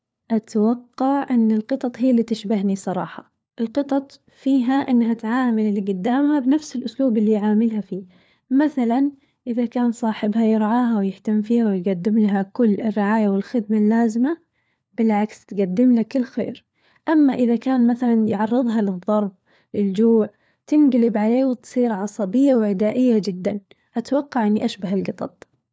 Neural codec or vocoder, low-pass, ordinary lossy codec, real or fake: codec, 16 kHz, 4 kbps, FunCodec, trained on LibriTTS, 50 frames a second; none; none; fake